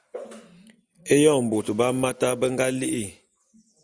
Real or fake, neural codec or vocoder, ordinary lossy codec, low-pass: real; none; Opus, 64 kbps; 9.9 kHz